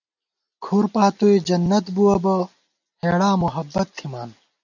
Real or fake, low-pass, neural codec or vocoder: real; 7.2 kHz; none